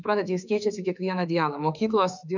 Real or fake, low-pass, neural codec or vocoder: fake; 7.2 kHz; autoencoder, 48 kHz, 32 numbers a frame, DAC-VAE, trained on Japanese speech